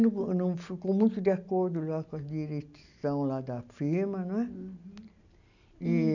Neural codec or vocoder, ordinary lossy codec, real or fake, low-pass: none; none; real; 7.2 kHz